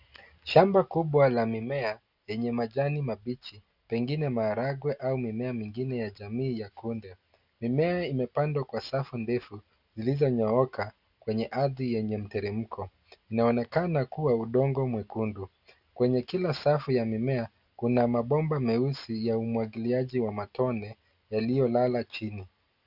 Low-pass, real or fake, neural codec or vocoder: 5.4 kHz; real; none